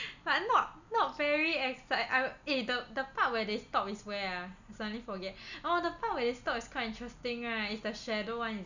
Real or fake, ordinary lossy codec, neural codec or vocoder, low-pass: real; none; none; 7.2 kHz